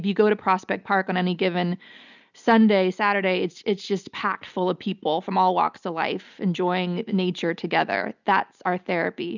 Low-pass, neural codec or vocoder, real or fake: 7.2 kHz; none; real